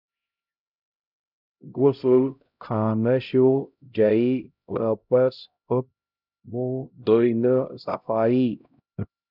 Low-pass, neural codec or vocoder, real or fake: 5.4 kHz; codec, 16 kHz, 0.5 kbps, X-Codec, HuBERT features, trained on LibriSpeech; fake